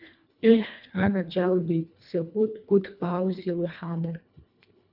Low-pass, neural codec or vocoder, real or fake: 5.4 kHz; codec, 24 kHz, 1.5 kbps, HILCodec; fake